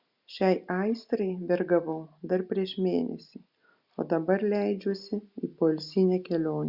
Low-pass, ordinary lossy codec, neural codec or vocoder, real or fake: 5.4 kHz; Opus, 64 kbps; none; real